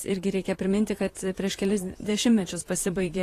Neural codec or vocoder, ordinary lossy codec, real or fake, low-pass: vocoder, 44.1 kHz, 128 mel bands, Pupu-Vocoder; AAC, 48 kbps; fake; 14.4 kHz